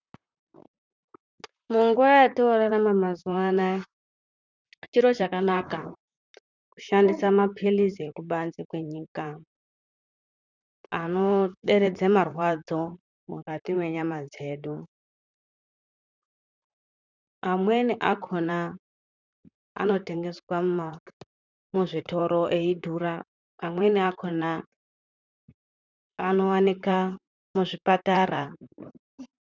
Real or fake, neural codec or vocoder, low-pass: fake; vocoder, 44.1 kHz, 128 mel bands, Pupu-Vocoder; 7.2 kHz